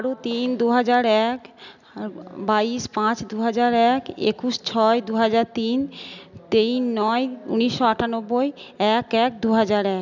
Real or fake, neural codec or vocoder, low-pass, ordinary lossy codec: real; none; 7.2 kHz; none